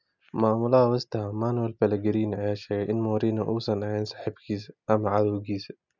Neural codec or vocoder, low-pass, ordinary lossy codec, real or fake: none; 7.2 kHz; none; real